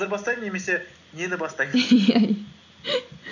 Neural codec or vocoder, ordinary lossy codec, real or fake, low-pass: none; none; real; 7.2 kHz